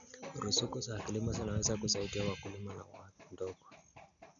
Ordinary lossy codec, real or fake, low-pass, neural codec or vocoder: none; real; 7.2 kHz; none